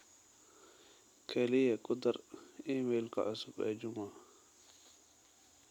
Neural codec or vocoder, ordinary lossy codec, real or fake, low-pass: none; none; real; 19.8 kHz